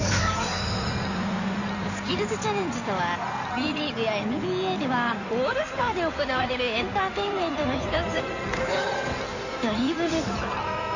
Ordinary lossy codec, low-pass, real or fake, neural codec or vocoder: none; 7.2 kHz; fake; codec, 16 kHz in and 24 kHz out, 2.2 kbps, FireRedTTS-2 codec